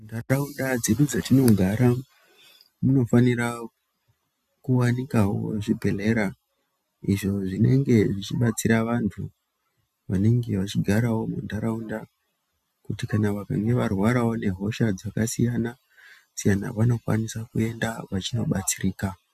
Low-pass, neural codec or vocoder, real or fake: 14.4 kHz; none; real